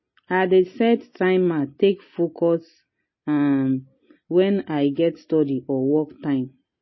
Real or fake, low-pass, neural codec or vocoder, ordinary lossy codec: real; 7.2 kHz; none; MP3, 24 kbps